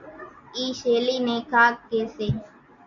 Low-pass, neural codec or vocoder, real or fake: 7.2 kHz; none; real